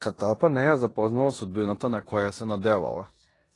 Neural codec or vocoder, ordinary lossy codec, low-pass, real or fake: codec, 16 kHz in and 24 kHz out, 0.9 kbps, LongCat-Audio-Codec, four codebook decoder; AAC, 32 kbps; 10.8 kHz; fake